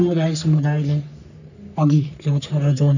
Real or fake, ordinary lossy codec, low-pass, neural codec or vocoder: fake; none; 7.2 kHz; codec, 44.1 kHz, 3.4 kbps, Pupu-Codec